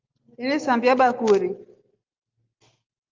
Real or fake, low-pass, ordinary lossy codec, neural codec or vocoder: real; 7.2 kHz; Opus, 32 kbps; none